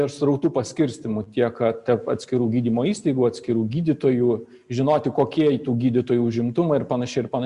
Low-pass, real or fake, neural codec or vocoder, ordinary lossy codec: 10.8 kHz; real; none; Opus, 64 kbps